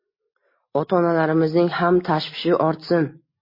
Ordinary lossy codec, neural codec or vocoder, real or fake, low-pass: MP3, 24 kbps; none; real; 5.4 kHz